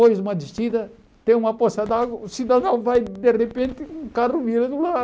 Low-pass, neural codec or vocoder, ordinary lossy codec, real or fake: none; none; none; real